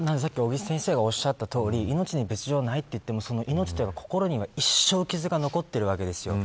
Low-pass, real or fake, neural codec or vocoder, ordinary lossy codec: none; real; none; none